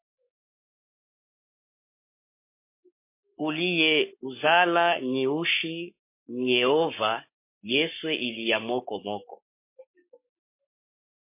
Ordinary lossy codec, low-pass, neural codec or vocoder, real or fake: MP3, 24 kbps; 3.6 kHz; codec, 44.1 kHz, 7.8 kbps, Pupu-Codec; fake